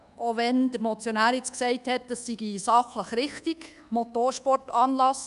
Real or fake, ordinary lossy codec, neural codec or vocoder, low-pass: fake; none; codec, 24 kHz, 1.2 kbps, DualCodec; 10.8 kHz